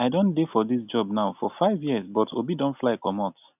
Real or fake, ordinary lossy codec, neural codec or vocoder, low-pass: real; none; none; 3.6 kHz